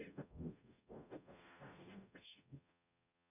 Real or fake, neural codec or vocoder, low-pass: fake; codec, 44.1 kHz, 0.9 kbps, DAC; 3.6 kHz